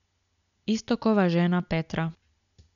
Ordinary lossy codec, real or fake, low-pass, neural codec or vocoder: none; real; 7.2 kHz; none